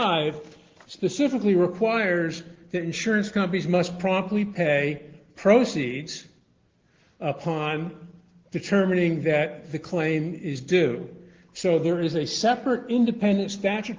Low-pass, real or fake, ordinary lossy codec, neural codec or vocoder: 7.2 kHz; real; Opus, 16 kbps; none